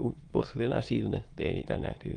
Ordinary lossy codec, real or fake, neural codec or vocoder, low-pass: Opus, 24 kbps; fake; autoencoder, 22.05 kHz, a latent of 192 numbers a frame, VITS, trained on many speakers; 9.9 kHz